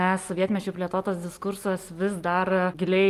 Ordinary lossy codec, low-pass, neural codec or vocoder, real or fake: Opus, 32 kbps; 14.4 kHz; none; real